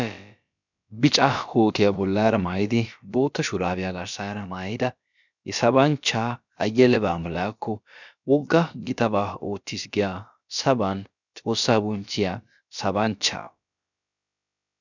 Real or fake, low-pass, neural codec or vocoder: fake; 7.2 kHz; codec, 16 kHz, about 1 kbps, DyCAST, with the encoder's durations